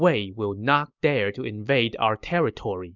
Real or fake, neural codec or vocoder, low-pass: real; none; 7.2 kHz